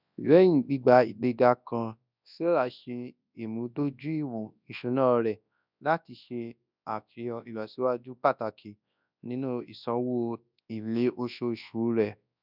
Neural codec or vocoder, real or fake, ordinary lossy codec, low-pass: codec, 24 kHz, 0.9 kbps, WavTokenizer, large speech release; fake; none; 5.4 kHz